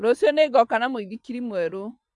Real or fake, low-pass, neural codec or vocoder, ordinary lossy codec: fake; 10.8 kHz; vocoder, 24 kHz, 100 mel bands, Vocos; MP3, 96 kbps